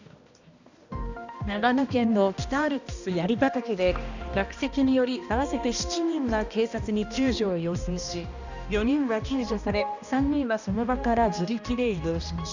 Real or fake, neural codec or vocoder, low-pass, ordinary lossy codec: fake; codec, 16 kHz, 1 kbps, X-Codec, HuBERT features, trained on balanced general audio; 7.2 kHz; none